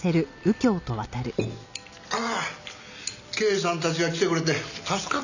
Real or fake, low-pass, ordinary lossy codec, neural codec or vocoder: real; 7.2 kHz; none; none